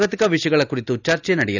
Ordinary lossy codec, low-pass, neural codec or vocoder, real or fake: none; 7.2 kHz; none; real